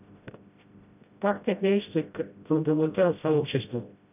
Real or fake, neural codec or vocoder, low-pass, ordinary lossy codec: fake; codec, 16 kHz, 0.5 kbps, FreqCodec, smaller model; 3.6 kHz; none